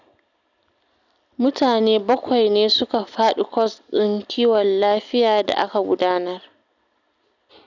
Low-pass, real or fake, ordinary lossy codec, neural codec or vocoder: 7.2 kHz; real; none; none